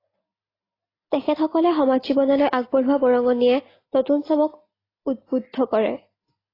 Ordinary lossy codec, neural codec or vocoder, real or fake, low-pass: AAC, 24 kbps; none; real; 5.4 kHz